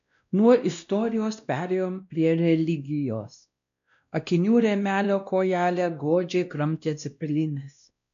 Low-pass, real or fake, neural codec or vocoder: 7.2 kHz; fake; codec, 16 kHz, 1 kbps, X-Codec, WavLM features, trained on Multilingual LibriSpeech